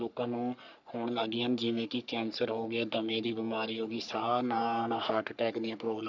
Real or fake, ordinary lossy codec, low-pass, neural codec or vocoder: fake; none; 7.2 kHz; codec, 44.1 kHz, 3.4 kbps, Pupu-Codec